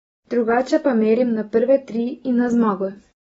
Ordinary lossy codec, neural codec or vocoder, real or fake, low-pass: AAC, 24 kbps; none; real; 10.8 kHz